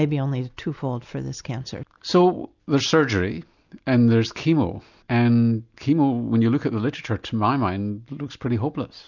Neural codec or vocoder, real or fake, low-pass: none; real; 7.2 kHz